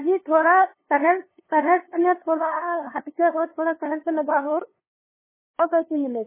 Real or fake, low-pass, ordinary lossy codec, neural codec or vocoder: fake; 3.6 kHz; MP3, 16 kbps; codec, 16 kHz, 2 kbps, FunCodec, trained on LibriTTS, 25 frames a second